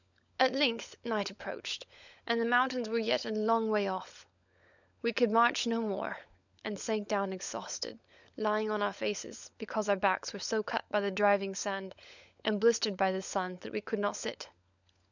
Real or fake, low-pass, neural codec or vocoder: fake; 7.2 kHz; codec, 16 kHz, 16 kbps, FunCodec, trained on LibriTTS, 50 frames a second